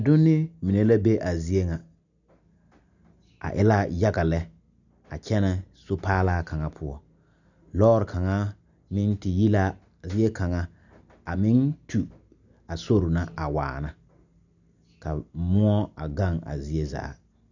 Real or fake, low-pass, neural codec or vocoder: real; 7.2 kHz; none